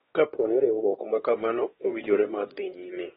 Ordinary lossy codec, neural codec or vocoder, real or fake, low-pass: AAC, 16 kbps; codec, 16 kHz, 2 kbps, X-Codec, WavLM features, trained on Multilingual LibriSpeech; fake; 7.2 kHz